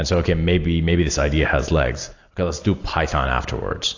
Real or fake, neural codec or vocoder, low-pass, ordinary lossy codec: real; none; 7.2 kHz; AAC, 48 kbps